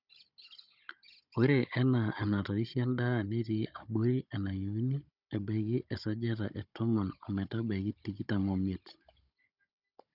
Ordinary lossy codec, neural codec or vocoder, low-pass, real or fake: none; codec, 16 kHz, 16 kbps, FunCodec, trained on Chinese and English, 50 frames a second; 5.4 kHz; fake